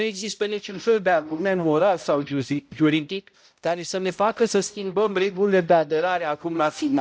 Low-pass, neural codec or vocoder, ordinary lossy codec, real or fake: none; codec, 16 kHz, 0.5 kbps, X-Codec, HuBERT features, trained on balanced general audio; none; fake